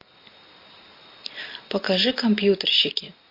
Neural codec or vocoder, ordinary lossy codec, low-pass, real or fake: none; MP3, 32 kbps; 5.4 kHz; real